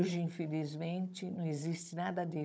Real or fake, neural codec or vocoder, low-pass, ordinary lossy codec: fake; codec, 16 kHz, 16 kbps, FunCodec, trained on Chinese and English, 50 frames a second; none; none